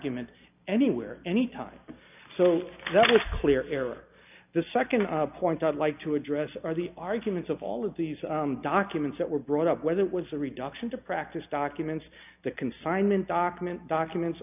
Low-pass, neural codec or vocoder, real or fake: 3.6 kHz; none; real